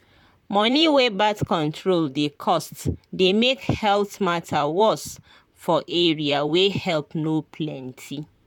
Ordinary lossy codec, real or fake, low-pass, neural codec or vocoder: none; fake; 19.8 kHz; vocoder, 44.1 kHz, 128 mel bands, Pupu-Vocoder